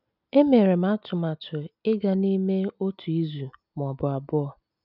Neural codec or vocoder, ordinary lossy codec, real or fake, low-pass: none; none; real; 5.4 kHz